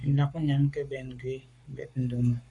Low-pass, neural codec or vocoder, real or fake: 10.8 kHz; codec, 44.1 kHz, 7.8 kbps, Pupu-Codec; fake